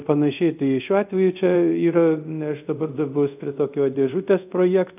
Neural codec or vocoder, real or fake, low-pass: codec, 24 kHz, 0.5 kbps, DualCodec; fake; 3.6 kHz